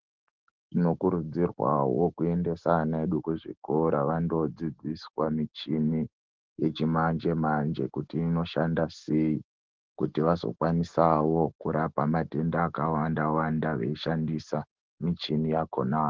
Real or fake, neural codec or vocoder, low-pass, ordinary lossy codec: real; none; 7.2 kHz; Opus, 16 kbps